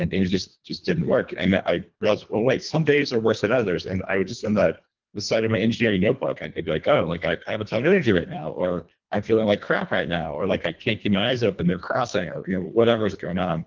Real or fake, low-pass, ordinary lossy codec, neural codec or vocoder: fake; 7.2 kHz; Opus, 24 kbps; codec, 24 kHz, 1.5 kbps, HILCodec